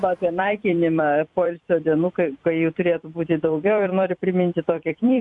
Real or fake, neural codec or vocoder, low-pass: fake; vocoder, 44.1 kHz, 128 mel bands every 256 samples, BigVGAN v2; 10.8 kHz